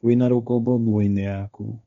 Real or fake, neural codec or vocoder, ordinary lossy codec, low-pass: fake; codec, 16 kHz, 1.1 kbps, Voila-Tokenizer; none; 7.2 kHz